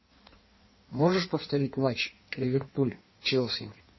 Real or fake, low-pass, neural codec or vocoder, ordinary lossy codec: fake; 7.2 kHz; codec, 16 kHz in and 24 kHz out, 1.1 kbps, FireRedTTS-2 codec; MP3, 24 kbps